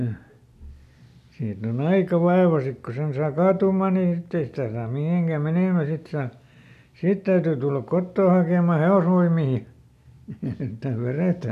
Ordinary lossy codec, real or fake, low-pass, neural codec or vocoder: none; real; 14.4 kHz; none